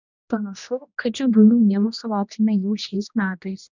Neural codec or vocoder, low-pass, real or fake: codec, 16 kHz, 1 kbps, X-Codec, HuBERT features, trained on general audio; 7.2 kHz; fake